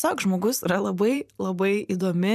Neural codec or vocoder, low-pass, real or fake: vocoder, 44.1 kHz, 128 mel bands every 512 samples, BigVGAN v2; 14.4 kHz; fake